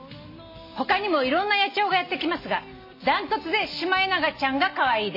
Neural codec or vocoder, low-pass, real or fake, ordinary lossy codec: none; 5.4 kHz; real; MP3, 24 kbps